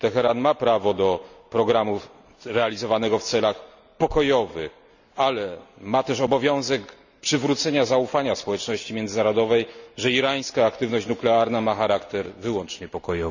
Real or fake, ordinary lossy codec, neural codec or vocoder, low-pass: real; none; none; 7.2 kHz